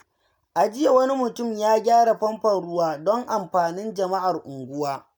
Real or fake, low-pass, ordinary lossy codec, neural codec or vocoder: real; none; none; none